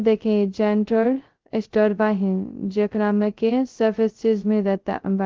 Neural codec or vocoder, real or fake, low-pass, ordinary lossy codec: codec, 16 kHz, 0.2 kbps, FocalCodec; fake; 7.2 kHz; Opus, 16 kbps